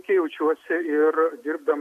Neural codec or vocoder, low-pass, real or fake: none; 14.4 kHz; real